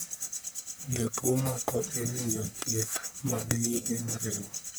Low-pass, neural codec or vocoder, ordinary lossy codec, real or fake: none; codec, 44.1 kHz, 1.7 kbps, Pupu-Codec; none; fake